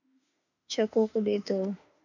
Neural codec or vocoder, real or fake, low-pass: autoencoder, 48 kHz, 32 numbers a frame, DAC-VAE, trained on Japanese speech; fake; 7.2 kHz